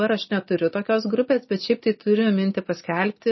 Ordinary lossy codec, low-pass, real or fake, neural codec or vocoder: MP3, 24 kbps; 7.2 kHz; real; none